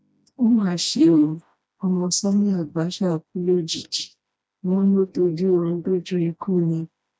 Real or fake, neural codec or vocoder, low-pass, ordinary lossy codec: fake; codec, 16 kHz, 1 kbps, FreqCodec, smaller model; none; none